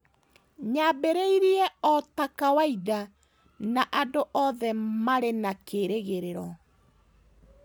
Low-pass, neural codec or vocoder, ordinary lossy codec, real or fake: none; none; none; real